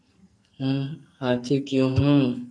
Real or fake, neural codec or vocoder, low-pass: fake; codec, 44.1 kHz, 2.6 kbps, SNAC; 9.9 kHz